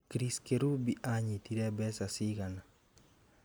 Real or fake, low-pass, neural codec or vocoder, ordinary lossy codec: real; none; none; none